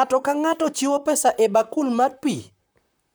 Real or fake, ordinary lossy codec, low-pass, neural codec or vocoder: fake; none; none; vocoder, 44.1 kHz, 128 mel bands, Pupu-Vocoder